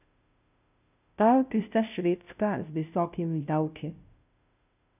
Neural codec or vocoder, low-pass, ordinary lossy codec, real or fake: codec, 16 kHz, 0.5 kbps, FunCodec, trained on Chinese and English, 25 frames a second; 3.6 kHz; none; fake